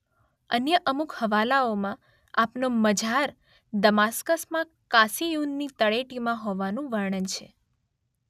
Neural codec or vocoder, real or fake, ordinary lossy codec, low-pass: none; real; none; 14.4 kHz